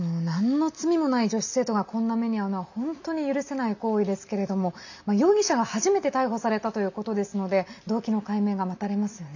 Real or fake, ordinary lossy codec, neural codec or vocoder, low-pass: real; none; none; 7.2 kHz